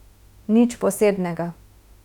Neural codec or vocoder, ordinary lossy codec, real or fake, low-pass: autoencoder, 48 kHz, 32 numbers a frame, DAC-VAE, trained on Japanese speech; none; fake; 19.8 kHz